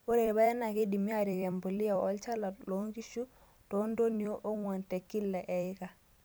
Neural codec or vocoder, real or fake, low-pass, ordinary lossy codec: vocoder, 44.1 kHz, 128 mel bands every 256 samples, BigVGAN v2; fake; none; none